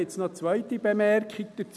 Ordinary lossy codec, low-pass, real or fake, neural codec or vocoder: none; none; real; none